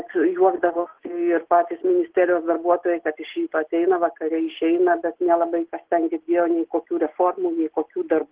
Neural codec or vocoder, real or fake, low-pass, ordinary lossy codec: none; real; 3.6 kHz; Opus, 16 kbps